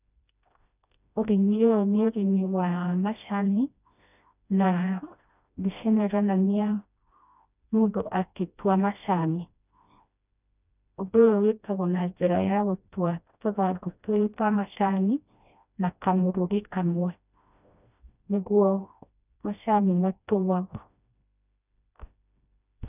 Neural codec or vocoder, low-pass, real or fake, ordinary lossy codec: codec, 16 kHz, 1 kbps, FreqCodec, smaller model; 3.6 kHz; fake; none